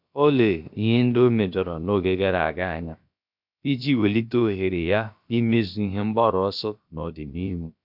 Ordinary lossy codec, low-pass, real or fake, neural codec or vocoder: none; 5.4 kHz; fake; codec, 16 kHz, about 1 kbps, DyCAST, with the encoder's durations